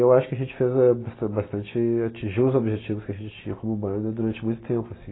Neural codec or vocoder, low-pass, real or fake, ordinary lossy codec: none; 7.2 kHz; real; AAC, 16 kbps